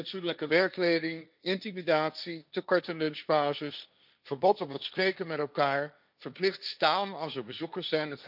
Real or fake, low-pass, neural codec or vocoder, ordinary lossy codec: fake; 5.4 kHz; codec, 16 kHz, 1.1 kbps, Voila-Tokenizer; none